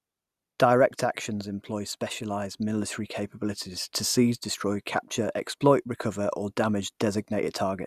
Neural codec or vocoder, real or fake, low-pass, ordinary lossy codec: none; real; 14.4 kHz; Opus, 64 kbps